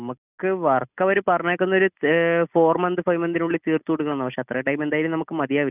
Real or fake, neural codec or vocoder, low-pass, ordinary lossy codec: real; none; 3.6 kHz; none